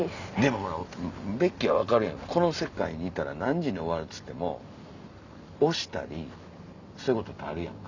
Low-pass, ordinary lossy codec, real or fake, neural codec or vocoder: 7.2 kHz; none; real; none